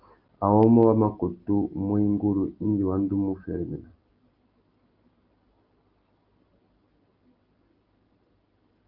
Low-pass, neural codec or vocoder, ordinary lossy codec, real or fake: 5.4 kHz; none; Opus, 24 kbps; real